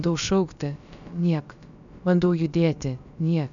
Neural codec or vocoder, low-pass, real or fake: codec, 16 kHz, about 1 kbps, DyCAST, with the encoder's durations; 7.2 kHz; fake